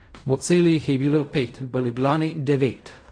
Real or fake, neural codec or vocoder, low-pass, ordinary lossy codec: fake; codec, 16 kHz in and 24 kHz out, 0.4 kbps, LongCat-Audio-Codec, fine tuned four codebook decoder; 9.9 kHz; AAC, 48 kbps